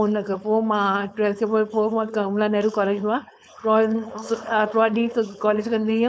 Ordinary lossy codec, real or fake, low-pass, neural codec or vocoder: none; fake; none; codec, 16 kHz, 4.8 kbps, FACodec